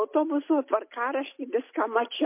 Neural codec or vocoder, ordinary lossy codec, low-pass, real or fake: none; MP3, 24 kbps; 3.6 kHz; real